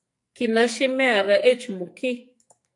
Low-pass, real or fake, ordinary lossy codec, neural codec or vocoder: 10.8 kHz; fake; AAC, 64 kbps; codec, 44.1 kHz, 2.6 kbps, SNAC